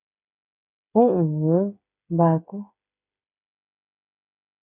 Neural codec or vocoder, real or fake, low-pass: codec, 16 kHz, 8 kbps, FreqCodec, smaller model; fake; 3.6 kHz